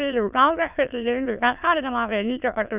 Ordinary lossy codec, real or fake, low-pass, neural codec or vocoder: none; fake; 3.6 kHz; autoencoder, 22.05 kHz, a latent of 192 numbers a frame, VITS, trained on many speakers